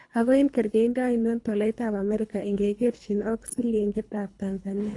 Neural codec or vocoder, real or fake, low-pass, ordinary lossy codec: codec, 24 kHz, 3 kbps, HILCodec; fake; 10.8 kHz; AAC, 48 kbps